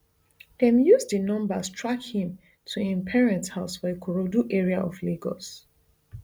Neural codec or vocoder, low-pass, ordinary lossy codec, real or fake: none; none; none; real